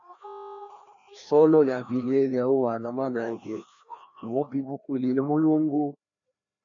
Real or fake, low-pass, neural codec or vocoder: fake; 7.2 kHz; codec, 16 kHz, 1 kbps, FreqCodec, larger model